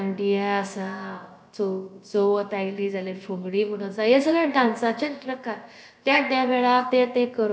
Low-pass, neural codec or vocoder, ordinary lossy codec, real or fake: none; codec, 16 kHz, about 1 kbps, DyCAST, with the encoder's durations; none; fake